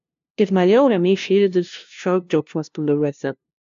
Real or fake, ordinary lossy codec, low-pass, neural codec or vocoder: fake; AAC, 64 kbps; 7.2 kHz; codec, 16 kHz, 0.5 kbps, FunCodec, trained on LibriTTS, 25 frames a second